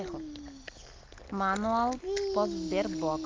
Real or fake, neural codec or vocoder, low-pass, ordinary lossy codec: real; none; 7.2 kHz; Opus, 32 kbps